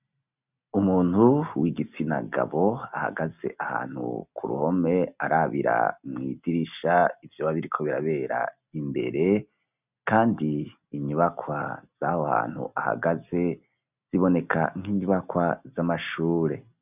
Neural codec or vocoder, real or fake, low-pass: none; real; 3.6 kHz